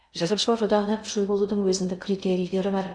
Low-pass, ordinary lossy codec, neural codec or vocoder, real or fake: 9.9 kHz; none; codec, 16 kHz in and 24 kHz out, 0.6 kbps, FocalCodec, streaming, 2048 codes; fake